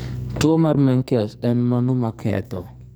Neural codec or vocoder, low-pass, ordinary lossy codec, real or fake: codec, 44.1 kHz, 2.6 kbps, SNAC; none; none; fake